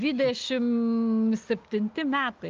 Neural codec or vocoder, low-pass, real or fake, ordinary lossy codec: none; 7.2 kHz; real; Opus, 32 kbps